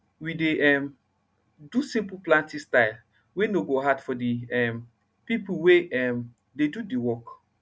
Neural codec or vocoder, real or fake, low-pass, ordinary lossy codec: none; real; none; none